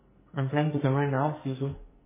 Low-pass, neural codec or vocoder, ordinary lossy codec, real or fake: 3.6 kHz; codec, 44.1 kHz, 2.6 kbps, SNAC; MP3, 16 kbps; fake